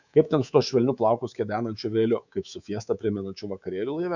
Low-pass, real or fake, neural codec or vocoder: 7.2 kHz; fake; codec, 24 kHz, 3.1 kbps, DualCodec